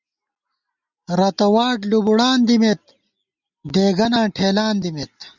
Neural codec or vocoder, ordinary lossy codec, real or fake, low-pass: none; Opus, 64 kbps; real; 7.2 kHz